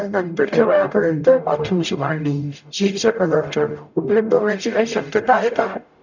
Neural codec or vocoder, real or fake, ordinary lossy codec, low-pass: codec, 44.1 kHz, 0.9 kbps, DAC; fake; none; 7.2 kHz